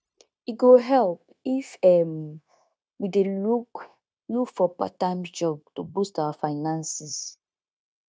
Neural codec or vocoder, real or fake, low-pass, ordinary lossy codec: codec, 16 kHz, 0.9 kbps, LongCat-Audio-Codec; fake; none; none